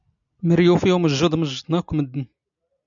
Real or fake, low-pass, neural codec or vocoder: real; 7.2 kHz; none